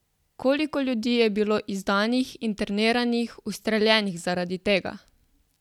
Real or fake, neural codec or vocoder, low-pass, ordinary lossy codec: real; none; 19.8 kHz; none